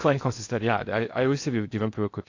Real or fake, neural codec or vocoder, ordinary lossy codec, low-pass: fake; codec, 16 kHz in and 24 kHz out, 0.6 kbps, FocalCodec, streaming, 2048 codes; AAC, 48 kbps; 7.2 kHz